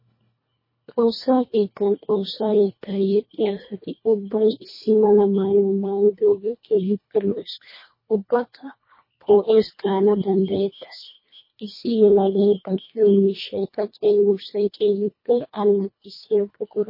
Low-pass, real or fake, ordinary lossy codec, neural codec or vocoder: 5.4 kHz; fake; MP3, 24 kbps; codec, 24 kHz, 1.5 kbps, HILCodec